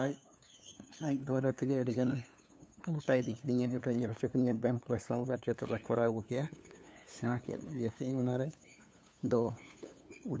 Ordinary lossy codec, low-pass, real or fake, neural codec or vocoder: none; none; fake; codec, 16 kHz, 2 kbps, FunCodec, trained on LibriTTS, 25 frames a second